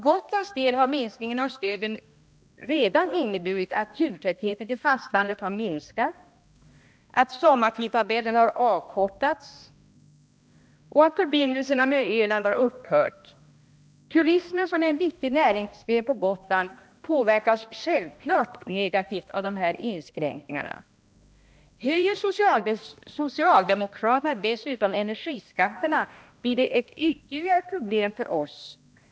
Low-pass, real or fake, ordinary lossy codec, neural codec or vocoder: none; fake; none; codec, 16 kHz, 1 kbps, X-Codec, HuBERT features, trained on balanced general audio